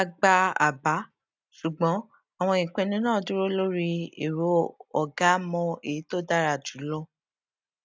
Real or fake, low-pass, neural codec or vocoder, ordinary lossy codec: real; none; none; none